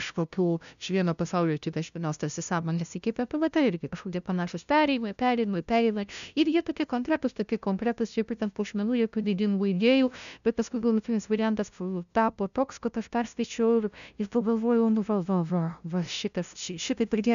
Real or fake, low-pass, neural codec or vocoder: fake; 7.2 kHz; codec, 16 kHz, 0.5 kbps, FunCodec, trained on LibriTTS, 25 frames a second